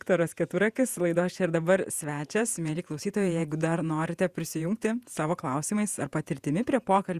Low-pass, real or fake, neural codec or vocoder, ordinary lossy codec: 14.4 kHz; fake; vocoder, 48 kHz, 128 mel bands, Vocos; Opus, 64 kbps